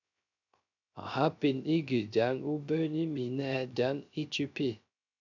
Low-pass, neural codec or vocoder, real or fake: 7.2 kHz; codec, 16 kHz, 0.3 kbps, FocalCodec; fake